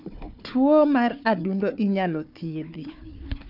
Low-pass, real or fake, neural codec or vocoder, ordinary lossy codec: 5.4 kHz; fake; codec, 16 kHz, 4 kbps, FunCodec, trained on Chinese and English, 50 frames a second; none